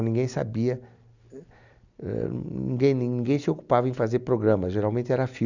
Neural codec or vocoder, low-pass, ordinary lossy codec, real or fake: none; 7.2 kHz; none; real